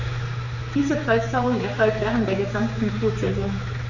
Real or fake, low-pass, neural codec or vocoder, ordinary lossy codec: fake; 7.2 kHz; codec, 16 kHz, 4 kbps, X-Codec, HuBERT features, trained on general audio; none